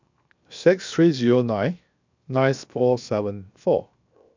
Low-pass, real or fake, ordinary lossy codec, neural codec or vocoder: 7.2 kHz; fake; MP3, 64 kbps; codec, 16 kHz, 0.7 kbps, FocalCodec